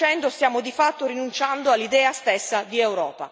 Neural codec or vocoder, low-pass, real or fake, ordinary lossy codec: none; none; real; none